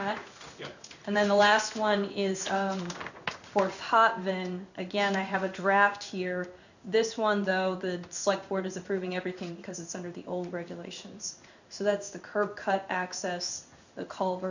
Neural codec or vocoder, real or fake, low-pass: codec, 16 kHz in and 24 kHz out, 1 kbps, XY-Tokenizer; fake; 7.2 kHz